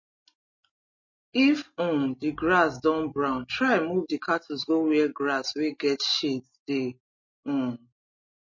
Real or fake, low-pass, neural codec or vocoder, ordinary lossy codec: real; 7.2 kHz; none; MP3, 32 kbps